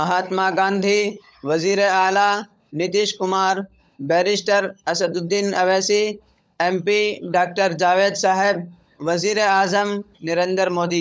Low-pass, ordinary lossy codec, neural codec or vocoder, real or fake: none; none; codec, 16 kHz, 16 kbps, FunCodec, trained on LibriTTS, 50 frames a second; fake